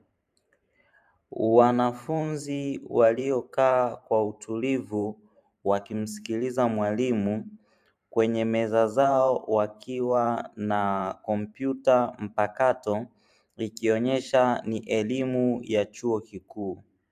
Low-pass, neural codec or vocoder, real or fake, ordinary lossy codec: 14.4 kHz; vocoder, 44.1 kHz, 128 mel bands every 512 samples, BigVGAN v2; fake; AAC, 96 kbps